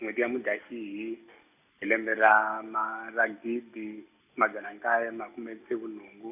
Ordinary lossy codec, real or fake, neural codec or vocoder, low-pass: none; real; none; 3.6 kHz